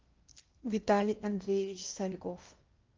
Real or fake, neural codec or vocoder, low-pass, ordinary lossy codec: fake; codec, 16 kHz in and 24 kHz out, 0.8 kbps, FocalCodec, streaming, 65536 codes; 7.2 kHz; Opus, 24 kbps